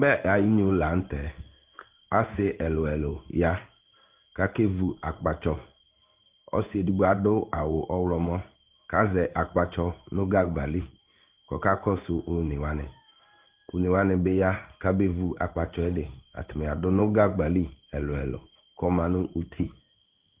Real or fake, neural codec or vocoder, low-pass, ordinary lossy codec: fake; codec, 16 kHz in and 24 kHz out, 1 kbps, XY-Tokenizer; 3.6 kHz; Opus, 32 kbps